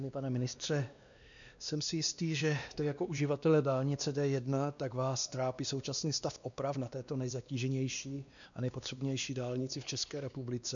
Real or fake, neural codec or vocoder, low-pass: fake; codec, 16 kHz, 2 kbps, X-Codec, WavLM features, trained on Multilingual LibriSpeech; 7.2 kHz